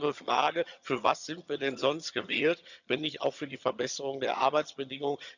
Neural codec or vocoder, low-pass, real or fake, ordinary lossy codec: vocoder, 22.05 kHz, 80 mel bands, HiFi-GAN; 7.2 kHz; fake; none